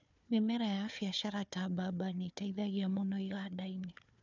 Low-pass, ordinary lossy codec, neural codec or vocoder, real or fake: 7.2 kHz; none; codec, 16 kHz, 4 kbps, FunCodec, trained on Chinese and English, 50 frames a second; fake